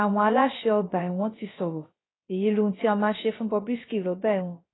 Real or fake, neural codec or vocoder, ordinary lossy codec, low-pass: fake; codec, 16 kHz, 0.3 kbps, FocalCodec; AAC, 16 kbps; 7.2 kHz